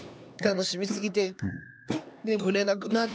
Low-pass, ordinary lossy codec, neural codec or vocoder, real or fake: none; none; codec, 16 kHz, 2 kbps, X-Codec, HuBERT features, trained on LibriSpeech; fake